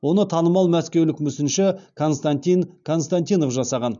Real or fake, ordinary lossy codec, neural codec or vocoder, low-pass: real; none; none; 7.2 kHz